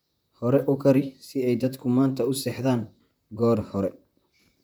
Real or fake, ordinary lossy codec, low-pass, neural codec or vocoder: fake; none; none; vocoder, 44.1 kHz, 128 mel bands, Pupu-Vocoder